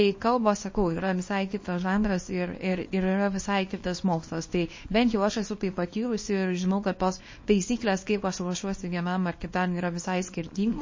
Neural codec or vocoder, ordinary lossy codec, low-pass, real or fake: codec, 24 kHz, 0.9 kbps, WavTokenizer, small release; MP3, 32 kbps; 7.2 kHz; fake